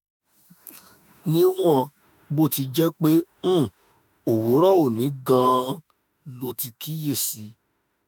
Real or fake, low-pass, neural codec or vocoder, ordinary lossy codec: fake; none; autoencoder, 48 kHz, 32 numbers a frame, DAC-VAE, trained on Japanese speech; none